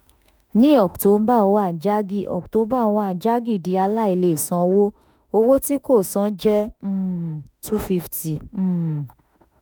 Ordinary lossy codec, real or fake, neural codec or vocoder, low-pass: none; fake; autoencoder, 48 kHz, 32 numbers a frame, DAC-VAE, trained on Japanese speech; none